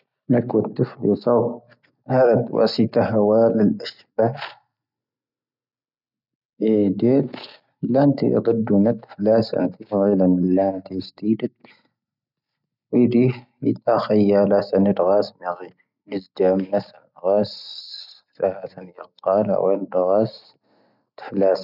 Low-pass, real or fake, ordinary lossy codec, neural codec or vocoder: 5.4 kHz; real; none; none